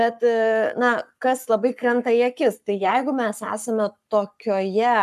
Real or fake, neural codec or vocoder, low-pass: fake; codec, 44.1 kHz, 7.8 kbps, Pupu-Codec; 14.4 kHz